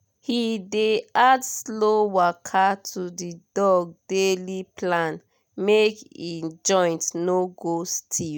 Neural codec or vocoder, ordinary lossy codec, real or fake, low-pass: none; none; real; 19.8 kHz